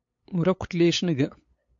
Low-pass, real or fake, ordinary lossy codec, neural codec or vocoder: 7.2 kHz; fake; MP3, 48 kbps; codec, 16 kHz, 8 kbps, FunCodec, trained on LibriTTS, 25 frames a second